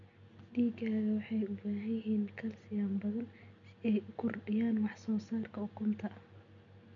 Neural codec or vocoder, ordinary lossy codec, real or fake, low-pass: none; none; real; 7.2 kHz